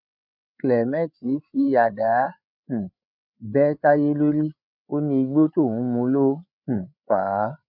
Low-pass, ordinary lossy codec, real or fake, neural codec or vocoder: 5.4 kHz; none; fake; codec, 16 kHz, 8 kbps, FreqCodec, larger model